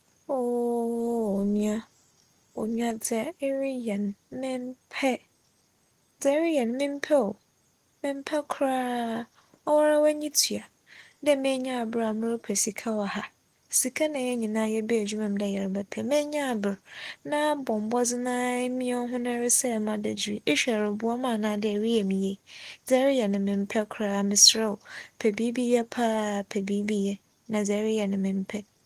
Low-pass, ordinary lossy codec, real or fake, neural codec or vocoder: 14.4 kHz; Opus, 24 kbps; real; none